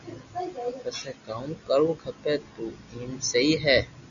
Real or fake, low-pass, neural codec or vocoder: real; 7.2 kHz; none